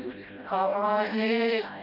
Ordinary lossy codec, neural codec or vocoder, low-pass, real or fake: none; codec, 16 kHz, 0.5 kbps, FreqCodec, smaller model; 5.4 kHz; fake